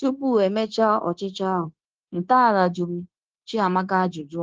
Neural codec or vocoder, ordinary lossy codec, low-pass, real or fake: codec, 16 kHz, 0.9 kbps, LongCat-Audio-Codec; Opus, 16 kbps; 7.2 kHz; fake